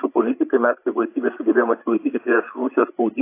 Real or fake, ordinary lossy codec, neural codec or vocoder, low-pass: fake; AAC, 24 kbps; codec, 16 kHz, 8 kbps, FreqCodec, larger model; 3.6 kHz